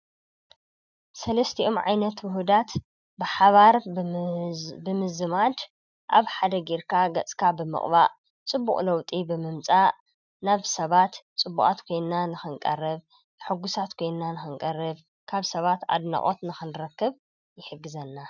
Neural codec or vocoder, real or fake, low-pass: none; real; 7.2 kHz